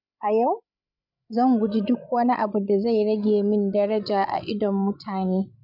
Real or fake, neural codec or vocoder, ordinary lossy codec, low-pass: fake; codec, 16 kHz, 16 kbps, FreqCodec, larger model; none; 5.4 kHz